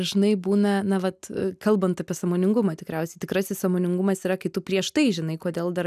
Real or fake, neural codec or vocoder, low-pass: real; none; 14.4 kHz